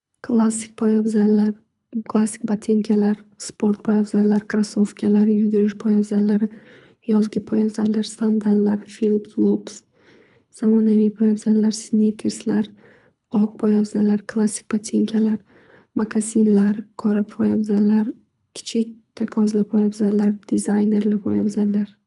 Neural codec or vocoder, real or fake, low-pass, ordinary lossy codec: codec, 24 kHz, 3 kbps, HILCodec; fake; 10.8 kHz; none